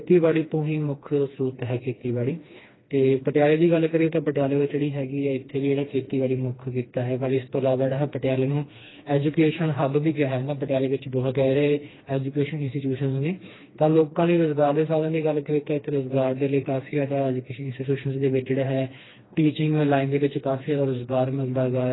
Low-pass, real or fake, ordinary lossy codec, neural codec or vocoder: 7.2 kHz; fake; AAC, 16 kbps; codec, 16 kHz, 2 kbps, FreqCodec, smaller model